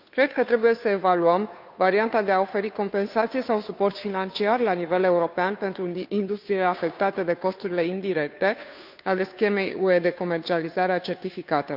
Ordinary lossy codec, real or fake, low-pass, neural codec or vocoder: none; fake; 5.4 kHz; codec, 16 kHz, 2 kbps, FunCodec, trained on Chinese and English, 25 frames a second